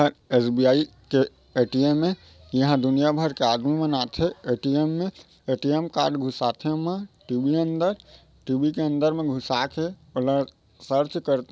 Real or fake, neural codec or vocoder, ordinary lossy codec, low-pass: real; none; none; none